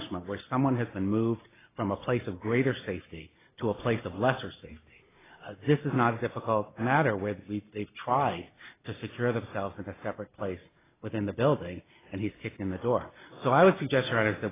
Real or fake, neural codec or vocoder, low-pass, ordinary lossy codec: real; none; 3.6 kHz; AAC, 16 kbps